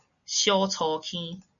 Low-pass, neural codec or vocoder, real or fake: 7.2 kHz; none; real